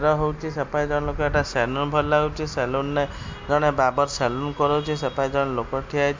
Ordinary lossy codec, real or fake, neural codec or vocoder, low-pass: MP3, 48 kbps; real; none; 7.2 kHz